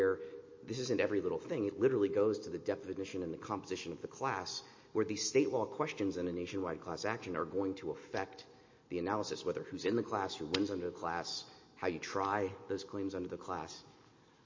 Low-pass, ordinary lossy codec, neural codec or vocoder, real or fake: 7.2 kHz; MP3, 32 kbps; none; real